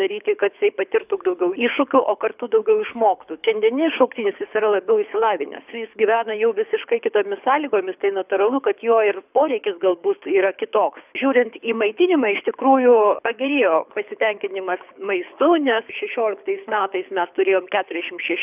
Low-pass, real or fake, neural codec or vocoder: 3.6 kHz; fake; codec, 24 kHz, 6 kbps, HILCodec